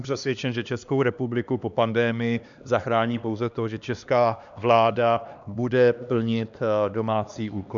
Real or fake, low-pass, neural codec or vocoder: fake; 7.2 kHz; codec, 16 kHz, 2 kbps, X-Codec, HuBERT features, trained on LibriSpeech